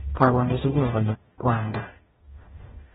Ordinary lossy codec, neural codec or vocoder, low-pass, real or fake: AAC, 16 kbps; codec, 44.1 kHz, 0.9 kbps, DAC; 19.8 kHz; fake